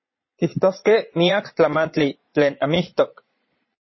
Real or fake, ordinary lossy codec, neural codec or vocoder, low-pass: fake; MP3, 24 kbps; vocoder, 22.05 kHz, 80 mel bands, Vocos; 7.2 kHz